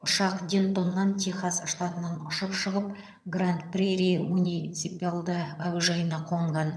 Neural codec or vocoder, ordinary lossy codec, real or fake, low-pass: vocoder, 22.05 kHz, 80 mel bands, HiFi-GAN; none; fake; none